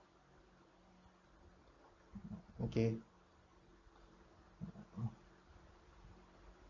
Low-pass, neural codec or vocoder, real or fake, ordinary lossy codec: 7.2 kHz; none; real; none